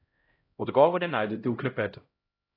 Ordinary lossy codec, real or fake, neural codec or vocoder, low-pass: AAC, 32 kbps; fake; codec, 16 kHz, 0.5 kbps, X-Codec, HuBERT features, trained on LibriSpeech; 5.4 kHz